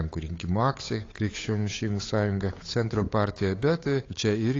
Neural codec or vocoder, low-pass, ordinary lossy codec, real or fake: codec, 16 kHz, 8 kbps, FunCodec, trained on Chinese and English, 25 frames a second; 7.2 kHz; AAC, 32 kbps; fake